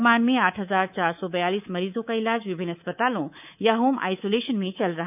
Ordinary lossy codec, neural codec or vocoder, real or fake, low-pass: none; codec, 24 kHz, 3.1 kbps, DualCodec; fake; 3.6 kHz